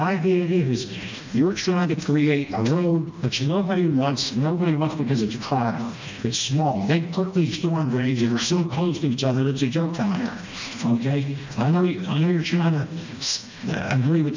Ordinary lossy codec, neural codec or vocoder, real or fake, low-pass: MP3, 64 kbps; codec, 16 kHz, 1 kbps, FreqCodec, smaller model; fake; 7.2 kHz